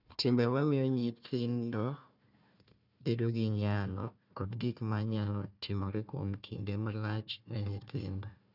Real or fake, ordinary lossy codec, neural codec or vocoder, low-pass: fake; none; codec, 16 kHz, 1 kbps, FunCodec, trained on Chinese and English, 50 frames a second; 5.4 kHz